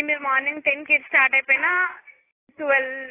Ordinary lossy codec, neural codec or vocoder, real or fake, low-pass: AAC, 16 kbps; none; real; 3.6 kHz